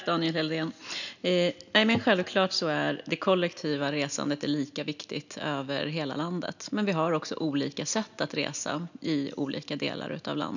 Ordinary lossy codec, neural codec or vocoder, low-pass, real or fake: none; none; 7.2 kHz; real